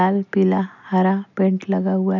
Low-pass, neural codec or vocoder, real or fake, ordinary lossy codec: 7.2 kHz; none; real; none